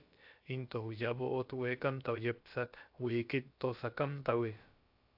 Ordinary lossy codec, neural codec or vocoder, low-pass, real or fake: AAC, 48 kbps; codec, 16 kHz, about 1 kbps, DyCAST, with the encoder's durations; 5.4 kHz; fake